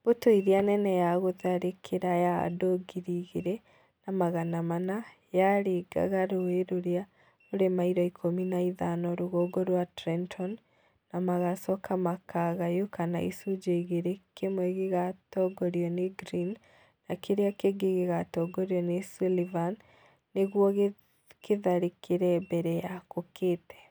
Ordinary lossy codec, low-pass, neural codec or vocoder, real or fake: none; none; none; real